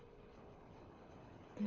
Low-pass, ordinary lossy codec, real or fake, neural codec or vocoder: 7.2 kHz; MP3, 64 kbps; fake; codec, 24 kHz, 6 kbps, HILCodec